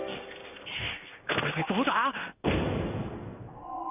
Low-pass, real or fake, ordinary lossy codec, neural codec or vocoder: 3.6 kHz; fake; none; codec, 16 kHz in and 24 kHz out, 1 kbps, XY-Tokenizer